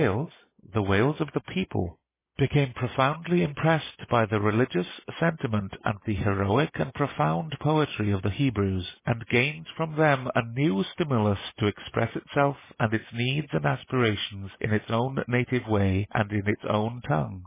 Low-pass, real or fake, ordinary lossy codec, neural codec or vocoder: 3.6 kHz; real; MP3, 16 kbps; none